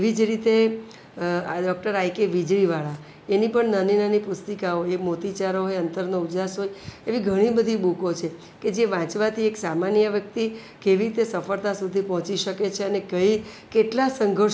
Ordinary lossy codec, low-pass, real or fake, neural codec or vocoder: none; none; real; none